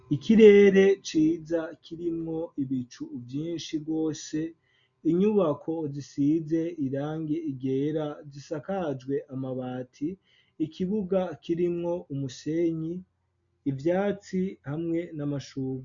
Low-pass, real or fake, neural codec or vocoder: 7.2 kHz; real; none